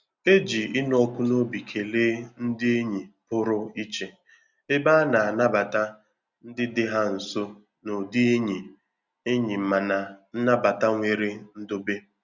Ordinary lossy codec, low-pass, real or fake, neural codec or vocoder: Opus, 64 kbps; 7.2 kHz; real; none